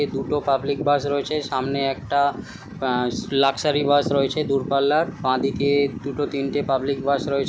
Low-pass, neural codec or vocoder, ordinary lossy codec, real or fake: none; none; none; real